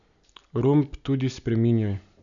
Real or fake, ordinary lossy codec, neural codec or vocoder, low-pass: real; none; none; 7.2 kHz